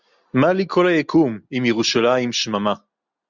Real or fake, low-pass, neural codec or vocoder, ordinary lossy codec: real; 7.2 kHz; none; Opus, 64 kbps